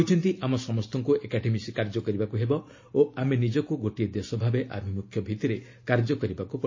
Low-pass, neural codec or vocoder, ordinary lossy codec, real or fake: 7.2 kHz; none; MP3, 32 kbps; real